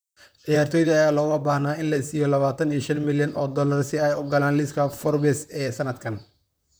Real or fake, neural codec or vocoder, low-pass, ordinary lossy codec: fake; vocoder, 44.1 kHz, 128 mel bands, Pupu-Vocoder; none; none